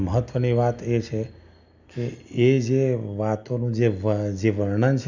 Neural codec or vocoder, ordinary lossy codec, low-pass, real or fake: none; none; 7.2 kHz; real